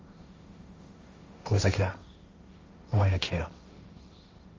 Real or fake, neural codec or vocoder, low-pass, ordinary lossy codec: fake; codec, 16 kHz, 1.1 kbps, Voila-Tokenizer; 7.2 kHz; Opus, 32 kbps